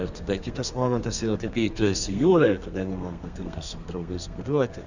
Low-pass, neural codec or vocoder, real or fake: 7.2 kHz; codec, 32 kHz, 1.9 kbps, SNAC; fake